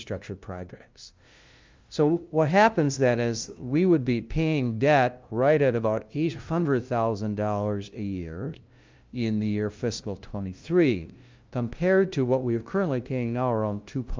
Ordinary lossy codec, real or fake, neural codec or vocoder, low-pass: Opus, 24 kbps; fake; codec, 16 kHz, 0.5 kbps, FunCodec, trained on LibriTTS, 25 frames a second; 7.2 kHz